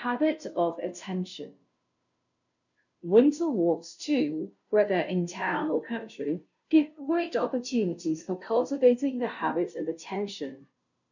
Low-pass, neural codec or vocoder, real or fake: 7.2 kHz; codec, 16 kHz, 0.5 kbps, FunCodec, trained on Chinese and English, 25 frames a second; fake